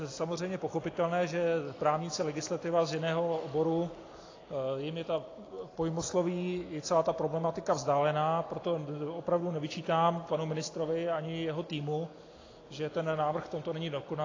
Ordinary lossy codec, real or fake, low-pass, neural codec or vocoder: AAC, 32 kbps; real; 7.2 kHz; none